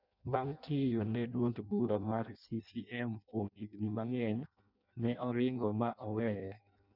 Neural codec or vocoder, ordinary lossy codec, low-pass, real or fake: codec, 16 kHz in and 24 kHz out, 0.6 kbps, FireRedTTS-2 codec; none; 5.4 kHz; fake